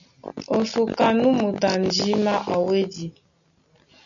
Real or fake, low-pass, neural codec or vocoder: real; 7.2 kHz; none